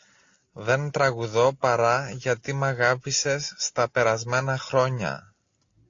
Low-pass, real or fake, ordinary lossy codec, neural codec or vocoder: 7.2 kHz; real; AAC, 48 kbps; none